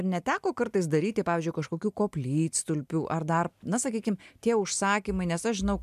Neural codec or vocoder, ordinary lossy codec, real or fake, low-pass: none; MP3, 96 kbps; real; 14.4 kHz